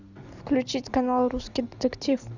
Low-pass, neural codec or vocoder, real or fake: 7.2 kHz; none; real